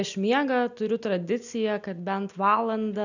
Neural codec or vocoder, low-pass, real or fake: none; 7.2 kHz; real